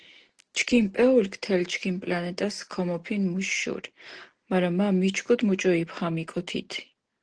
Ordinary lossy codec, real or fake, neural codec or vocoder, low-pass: Opus, 16 kbps; real; none; 9.9 kHz